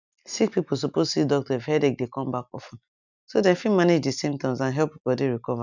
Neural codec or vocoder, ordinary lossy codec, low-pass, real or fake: none; none; 7.2 kHz; real